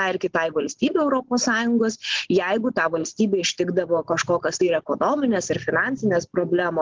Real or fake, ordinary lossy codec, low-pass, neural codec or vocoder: real; Opus, 16 kbps; 7.2 kHz; none